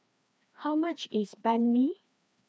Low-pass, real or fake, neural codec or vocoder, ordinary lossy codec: none; fake; codec, 16 kHz, 2 kbps, FreqCodec, larger model; none